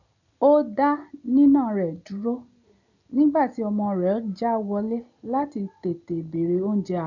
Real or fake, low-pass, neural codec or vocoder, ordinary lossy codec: real; 7.2 kHz; none; none